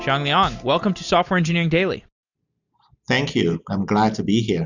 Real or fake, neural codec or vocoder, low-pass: real; none; 7.2 kHz